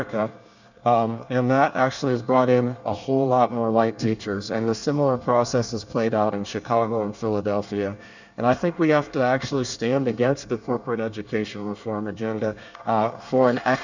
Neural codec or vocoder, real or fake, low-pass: codec, 24 kHz, 1 kbps, SNAC; fake; 7.2 kHz